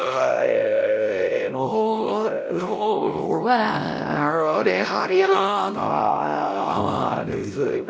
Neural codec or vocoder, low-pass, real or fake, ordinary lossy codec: codec, 16 kHz, 0.5 kbps, X-Codec, WavLM features, trained on Multilingual LibriSpeech; none; fake; none